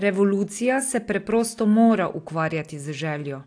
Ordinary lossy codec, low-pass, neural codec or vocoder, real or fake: AAC, 48 kbps; 9.9 kHz; none; real